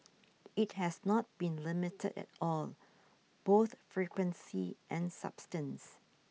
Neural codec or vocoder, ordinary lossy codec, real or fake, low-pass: none; none; real; none